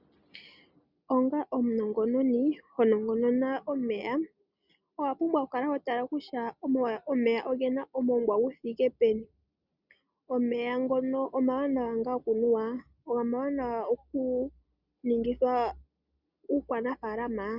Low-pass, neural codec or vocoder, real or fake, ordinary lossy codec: 5.4 kHz; none; real; Opus, 64 kbps